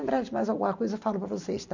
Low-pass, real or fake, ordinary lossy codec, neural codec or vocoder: 7.2 kHz; real; none; none